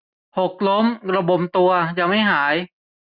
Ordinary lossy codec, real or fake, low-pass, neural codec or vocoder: none; real; 5.4 kHz; none